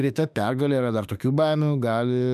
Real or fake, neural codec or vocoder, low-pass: fake; autoencoder, 48 kHz, 32 numbers a frame, DAC-VAE, trained on Japanese speech; 14.4 kHz